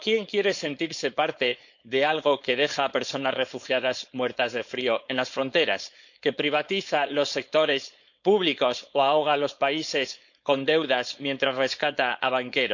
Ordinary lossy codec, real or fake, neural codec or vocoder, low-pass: none; fake; codec, 16 kHz, 4.8 kbps, FACodec; 7.2 kHz